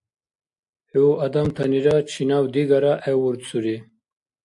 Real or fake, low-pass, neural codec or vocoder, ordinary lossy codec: real; 10.8 kHz; none; AAC, 64 kbps